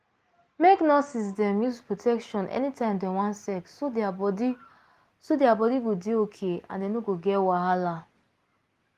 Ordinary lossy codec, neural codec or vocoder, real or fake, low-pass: Opus, 24 kbps; none; real; 14.4 kHz